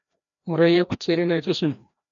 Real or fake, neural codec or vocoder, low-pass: fake; codec, 16 kHz, 1 kbps, FreqCodec, larger model; 7.2 kHz